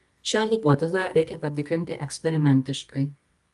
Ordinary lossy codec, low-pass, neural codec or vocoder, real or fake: Opus, 32 kbps; 10.8 kHz; codec, 24 kHz, 0.9 kbps, WavTokenizer, medium music audio release; fake